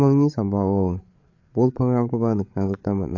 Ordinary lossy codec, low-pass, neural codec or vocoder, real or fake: AAC, 48 kbps; 7.2 kHz; codec, 16 kHz, 8 kbps, FreqCodec, larger model; fake